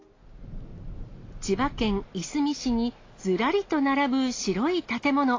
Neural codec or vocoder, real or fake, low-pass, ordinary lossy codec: none; real; 7.2 kHz; AAC, 32 kbps